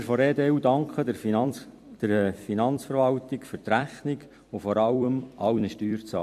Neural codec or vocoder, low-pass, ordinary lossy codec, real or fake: vocoder, 44.1 kHz, 128 mel bands every 256 samples, BigVGAN v2; 14.4 kHz; MP3, 64 kbps; fake